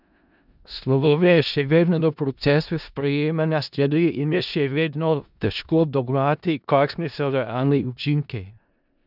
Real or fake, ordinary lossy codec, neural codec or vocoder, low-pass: fake; none; codec, 16 kHz in and 24 kHz out, 0.4 kbps, LongCat-Audio-Codec, four codebook decoder; 5.4 kHz